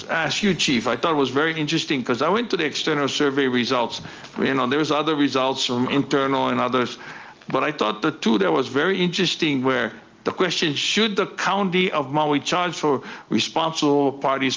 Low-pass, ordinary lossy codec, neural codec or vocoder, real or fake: 7.2 kHz; Opus, 24 kbps; none; real